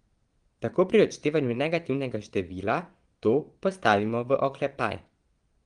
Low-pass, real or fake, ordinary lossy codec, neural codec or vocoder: 9.9 kHz; fake; Opus, 24 kbps; vocoder, 22.05 kHz, 80 mel bands, Vocos